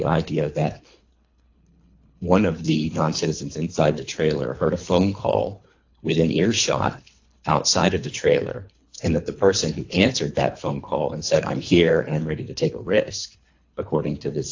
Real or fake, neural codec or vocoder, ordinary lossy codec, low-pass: fake; codec, 24 kHz, 3 kbps, HILCodec; AAC, 48 kbps; 7.2 kHz